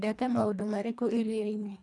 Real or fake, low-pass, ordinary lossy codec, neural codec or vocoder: fake; none; none; codec, 24 kHz, 1.5 kbps, HILCodec